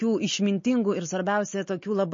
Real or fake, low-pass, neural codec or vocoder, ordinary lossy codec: real; 7.2 kHz; none; MP3, 32 kbps